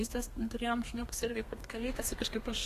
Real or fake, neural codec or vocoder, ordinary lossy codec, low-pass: fake; codec, 32 kHz, 1.9 kbps, SNAC; AAC, 48 kbps; 14.4 kHz